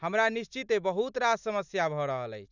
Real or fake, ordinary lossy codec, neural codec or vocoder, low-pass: real; none; none; 7.2 kHz